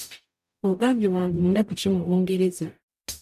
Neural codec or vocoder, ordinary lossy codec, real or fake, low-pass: codec, 44.1 kHz, 0.9 kbps, DAC; none; fake; 14.4 kHz